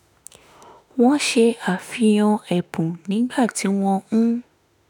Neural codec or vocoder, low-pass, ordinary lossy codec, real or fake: autoencoder, 48 kHz, 32 numbers a frame, DAC-VAE, trained on Japanese speech; 19.8 kHz; none; fake